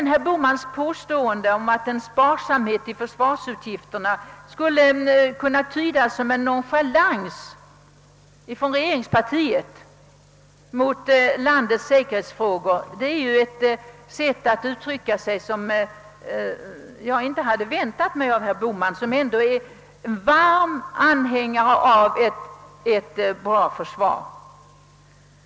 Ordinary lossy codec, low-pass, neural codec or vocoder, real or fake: none; none; none; real